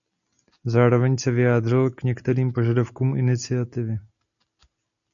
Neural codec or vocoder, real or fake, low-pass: none; real; 7.2 kHz